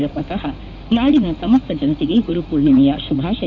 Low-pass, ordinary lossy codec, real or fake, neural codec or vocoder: 7.2 kHz; none; fake; codec, 16 kHz in and 24 kHz out, 2.2 kbps, FireRedTTS-2 codec